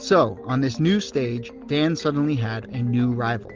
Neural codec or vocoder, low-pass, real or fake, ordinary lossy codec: none; 7.2 kHz; real; Opus, 32 kbps